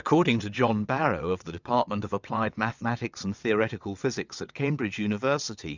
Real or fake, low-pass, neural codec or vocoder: fake; 7.2 kHz; vocoder, 22.05 kHz, 80 mel bands, WaveNeXt